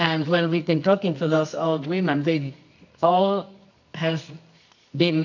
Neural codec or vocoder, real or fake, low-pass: codec, 24 kHz, 0.9 kbps, WavTokenizer, medium music audio release; fake; 7.2 kHz